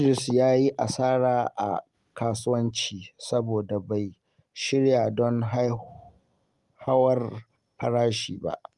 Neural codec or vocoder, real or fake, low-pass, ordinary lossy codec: none; real; 10.8 kHz; Opus, 32 kbps